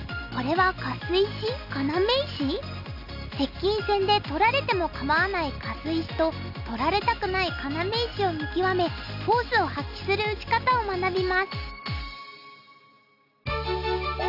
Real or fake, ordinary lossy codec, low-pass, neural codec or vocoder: real; none; 5.4 kHz; none